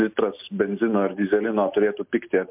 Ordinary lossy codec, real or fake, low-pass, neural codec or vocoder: MP3, 32 kbps; real; 3.6 kHz; none